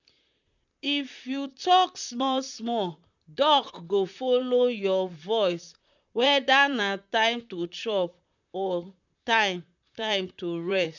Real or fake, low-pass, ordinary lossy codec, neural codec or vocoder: fake; 7.2 kHz; none; vocoder, 44.1 kHz, 128 mel bands, Pupu-Vocoder